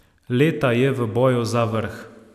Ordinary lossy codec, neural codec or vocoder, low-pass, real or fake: none; none; 14.4 kHz; real